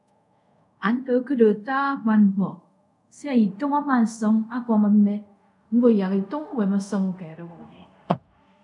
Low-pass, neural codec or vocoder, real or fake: 10.8 kHz; codec, 24 kHz, 0.5 kbps, DualCodec; fake